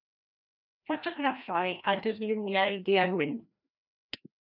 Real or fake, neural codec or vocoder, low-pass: fake; codec, 16 kHz, 1 kbps, FreqCodec, larger model; 5.4 kHz